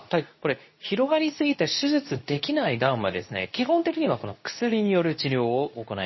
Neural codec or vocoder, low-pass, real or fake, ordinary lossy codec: codec, 24 kHz, 0.9 kbps, WavTokenizer, medium speech release version 2; 7.2 kHz; fake; MP3, 24 kbps